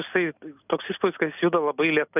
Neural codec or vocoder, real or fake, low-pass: none; real; 3.6 kHz